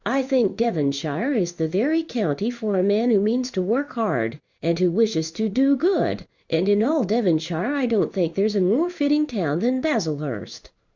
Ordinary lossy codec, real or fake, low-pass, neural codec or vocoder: Opus, 64 kbps; fake; 7.2 kHz; codec, 16 kHz in and 24 kHz out, 1 kbps, XY-Tokenizer